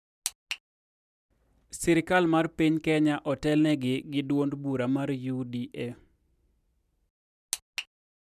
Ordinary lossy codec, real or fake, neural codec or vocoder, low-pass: none; real; none; 14.4 kHz